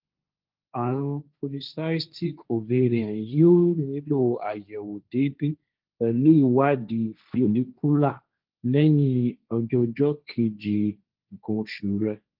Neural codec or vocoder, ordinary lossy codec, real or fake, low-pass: codec, 16 kHz, 1.1 kbps, Voila-Tokenizer; Opus, 24 kbps; fake; 5.4 kHz